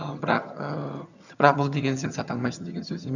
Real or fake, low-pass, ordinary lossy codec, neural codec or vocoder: fake; 7.2 kHz; none; vocoder, 22.05 kHz, 80 mel bands, HiFi-GAN